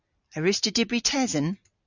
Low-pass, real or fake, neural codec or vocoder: 7.2 kHz; real; none